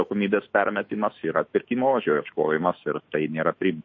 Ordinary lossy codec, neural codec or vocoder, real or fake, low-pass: MP3, 32 kbps; autoencoder, 48 kHz, 128 numbers a frame, DAC-VAE, trained on Japanese speech; fake; 7.2 kHz